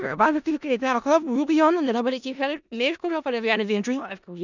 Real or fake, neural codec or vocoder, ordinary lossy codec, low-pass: fake; codec, 16 kHz in and 24 kHz out, 0.4 kbps, LongCat-Audio-Codec, four codebook decoder; none; 7.2 kHz